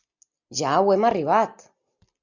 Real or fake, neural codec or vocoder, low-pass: real; none; 7.2 kHz